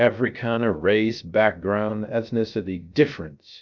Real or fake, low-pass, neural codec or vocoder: fake; 7.2 kHz; codec, 16 kHz, 0.3 kbps, FocalCodec